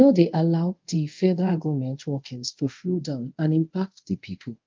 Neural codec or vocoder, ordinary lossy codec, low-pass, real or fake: codec, 24 kHz, 0.5 kbps, DualCodec; Opus, 24 kbps; 7.2 kHz; fake